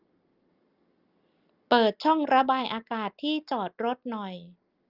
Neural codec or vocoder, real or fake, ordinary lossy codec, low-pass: none; real; Opus, 32 kbps; 5.4 kHz